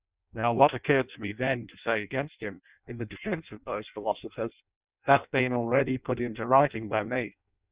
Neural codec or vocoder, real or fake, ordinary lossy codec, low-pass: codec, 16 kHz in and 24 kHz out, 0.6 kbps, FireRedTTS-2 codec; fake; Opus, 64 kbps; 3.6 kHz